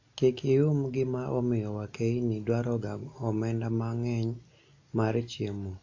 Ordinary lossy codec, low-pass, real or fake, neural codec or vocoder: AAC, 48 kbps; 7.2 kHz; real; none